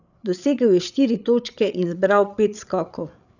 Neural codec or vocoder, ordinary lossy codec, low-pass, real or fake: codec, 16 kHz, 8 kbps, FreqCodec, larger model; none; 7.2 kHz; fake